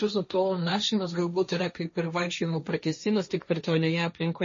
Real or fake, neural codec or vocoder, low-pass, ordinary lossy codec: fake; codec, 16 kHz, 1.1 kbps, Voila-Tokenizer; 7.2 kHz; MP3, 32 kbps